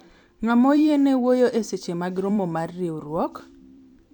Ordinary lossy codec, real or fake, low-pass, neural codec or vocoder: MP3, 96 kbps; fake; 19.8 kHz; vocoder, 44.1 kHz, 128 mel bands every 256 samples, BigVGAN v2